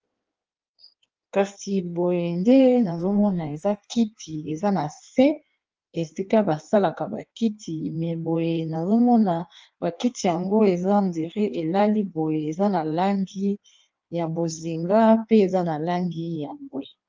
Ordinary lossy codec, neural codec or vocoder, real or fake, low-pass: Opus, 24 kbps; codec, 16 kHz in and 24 kHz out, 1.1 kbps, FireRedTTS-2 codec; fake; 7.2 kHz